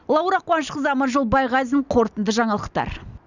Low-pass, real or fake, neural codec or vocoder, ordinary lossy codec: 7.2 kHz; real; none; none